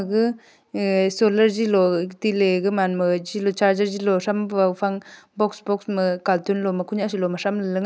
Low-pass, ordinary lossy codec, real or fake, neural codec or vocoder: none; none; real; none